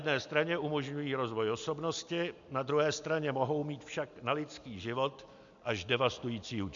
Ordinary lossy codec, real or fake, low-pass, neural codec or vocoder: MP3, 64 kbps; real; 7.2 kHz; none